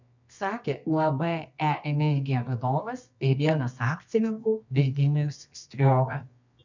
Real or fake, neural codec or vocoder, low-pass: fake; codec, 24 kHz, 0.9 kbps, WavTokenizer, medium music audio release; 7.2 kHz